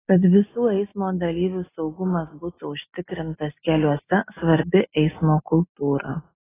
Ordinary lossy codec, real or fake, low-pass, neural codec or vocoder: AAC, 16 kbps; real; 3.6 kHz; none